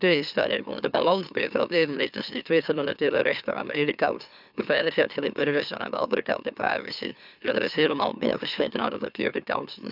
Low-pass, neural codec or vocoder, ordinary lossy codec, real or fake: 5.4 kHz; autoencoder, 44.1 kHz, a latent of 192 numbers a frame, MeloTTS; none; fake